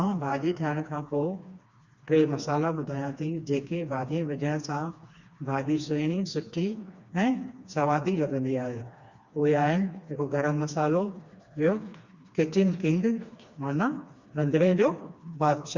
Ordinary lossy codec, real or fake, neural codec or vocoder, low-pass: Opus, 64 kbps; fake; codec, 16 kHz, 2 kbps, FreqCodec, smaller model; 7.2 kHz